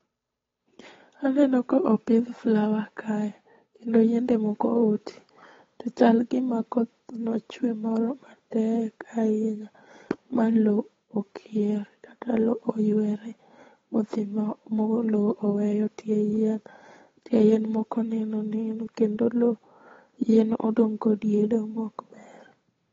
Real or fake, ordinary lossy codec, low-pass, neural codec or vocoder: fake; AAC, 24 kbps; 7.2 kHz; codec, 16 kHz, 8 kbps, FunCodec, trained on Chinese and English, 25 frames a second